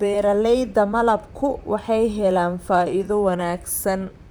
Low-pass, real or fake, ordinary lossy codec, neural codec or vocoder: none; fake; none; vocoder, 44.1 kHz, 128 mel bands, Pupu-Vocoder